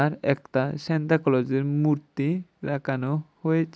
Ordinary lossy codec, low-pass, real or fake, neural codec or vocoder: none; none; real; none